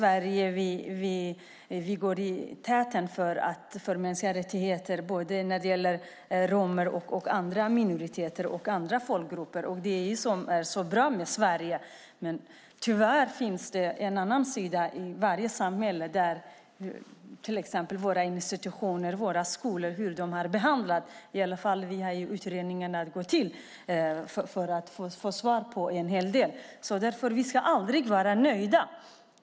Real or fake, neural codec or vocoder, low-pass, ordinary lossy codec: real; none; none; none